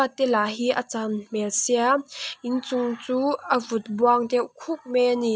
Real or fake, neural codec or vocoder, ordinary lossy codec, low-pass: real; none; none; none